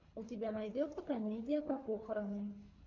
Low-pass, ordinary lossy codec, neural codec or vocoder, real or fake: 7.2 kHz; MP3, 48 kbps; codec, 24 kHz, 3 kbps, HILCodec; fake